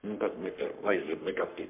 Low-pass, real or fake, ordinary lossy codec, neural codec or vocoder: 3.6 kHz; fake; MP3, 32 kbps; codec, 44.1 kHz, 3.4 kbps, Pupu-Codec